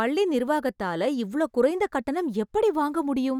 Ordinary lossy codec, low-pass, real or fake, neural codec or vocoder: none; 19.8 kHz; real; none